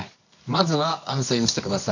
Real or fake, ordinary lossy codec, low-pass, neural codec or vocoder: fake; none; 7.2 kHz; codec, 24 kHz, 0.9 kbps, WavTokenizer, medium music audio release